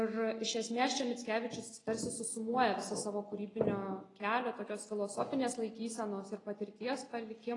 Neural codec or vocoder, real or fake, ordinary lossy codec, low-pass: none; real; AAC, 32 kbps; 10.8 kHz